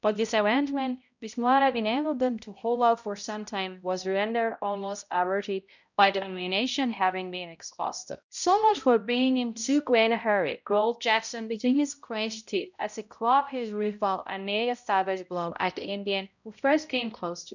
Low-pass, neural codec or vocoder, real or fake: 7.2 kHz; codec, 16 kHz, 0.5 kbps, X-Codec, HuBERT features, trained on balanced general audio; fake